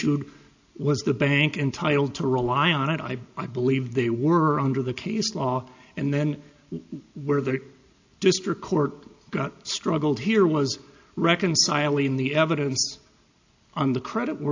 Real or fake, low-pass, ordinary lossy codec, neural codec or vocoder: real; 7.2 kHz; AAC, 48 kbps; none